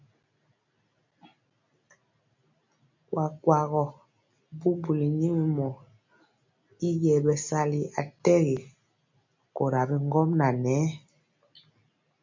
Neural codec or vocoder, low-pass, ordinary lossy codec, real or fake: none; 7.2 kHz; MP3, 64 kbps; real